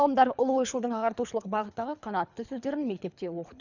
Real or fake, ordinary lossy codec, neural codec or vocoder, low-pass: fake; none; codec, 24 kHz, 3 kbps, HILCodec; 7.2 kHz